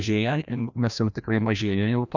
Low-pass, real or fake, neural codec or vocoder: 7.2 kHz; fake; codec, 16 kHz, 1 kbps, FreqCodec, larger model